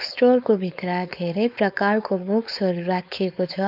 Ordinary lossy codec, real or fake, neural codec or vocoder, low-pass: none; fake; codec, 16 kHz, 4.8 kbps, FACodec; 5.4 kHz